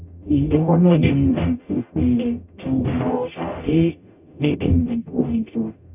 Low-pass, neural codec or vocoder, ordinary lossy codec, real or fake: 3.6 kHz; codec, 44.1 kHz, 0.9 kbps, DAC; none; fake